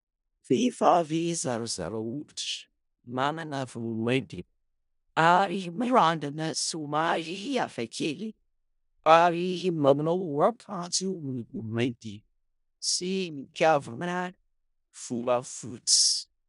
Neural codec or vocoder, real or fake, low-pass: codec, 16 kHz in and 24 kHz out, 0.4 kbps, LongCat-Audio-Codec, four codebook decoder; fake; 10.8 kHz